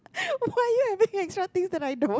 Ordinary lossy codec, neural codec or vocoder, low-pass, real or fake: none; none; none; real